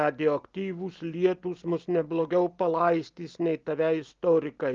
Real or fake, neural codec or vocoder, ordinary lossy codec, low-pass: real; none; Opus, 32 kbps; 7.2 kHz